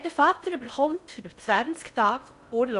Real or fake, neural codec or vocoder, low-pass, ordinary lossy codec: fake; codec, 16 kHz in and 24 kHz out, 0.6 kbps, FocalCodec, streaming, 4096 codes; 10.8 kHz; none